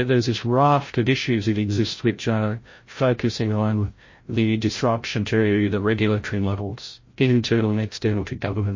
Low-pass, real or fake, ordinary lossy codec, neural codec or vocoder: 7.2 kHz; fake; MP3, 32 kbps; codec, 16 kHz, 0.5 kbps, FreqCodec, larger model